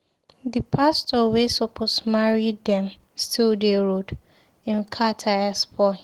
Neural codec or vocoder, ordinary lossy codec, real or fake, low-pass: none; Opus, 16 kbps; real; 19.8 kHz